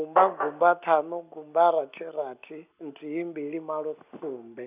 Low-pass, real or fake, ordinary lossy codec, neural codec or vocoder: 3.6 kHz; real; none; none